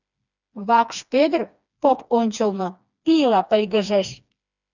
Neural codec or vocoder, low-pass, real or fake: codec, 16 kHz, 2 kbps, FreqCodec, smaller model; 7.2 kHz; fake